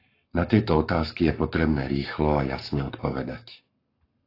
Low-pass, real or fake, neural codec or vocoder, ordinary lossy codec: 5.4 kHz; fake; codec, 44.1 kHz, 7.8 kbps, Pupu-Codec; AAC, 32 kbps